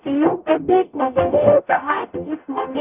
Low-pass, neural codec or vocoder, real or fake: 3.6 kHz; codec, 44.1 kHz, 0.9 kbps, DAC; fake